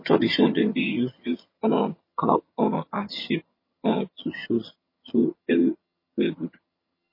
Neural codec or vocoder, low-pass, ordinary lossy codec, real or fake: vocoder, 22.05 kHz, 80 mel bands, HiFi-GAN; 5.4 kHz; MP3, 24 kbps; fake